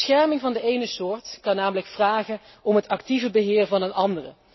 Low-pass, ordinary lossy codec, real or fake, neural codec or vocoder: 7.2 kHz; MP3, 24 kbps; real; none